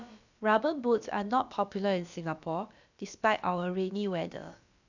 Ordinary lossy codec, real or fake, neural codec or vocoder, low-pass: none; fake; codec, 16 kHz, about 1 kbps, DyCAST, with the encoder's durations; 7.2 kHz